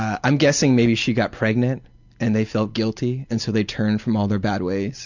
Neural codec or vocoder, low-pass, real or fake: none; 7.2 kHz; real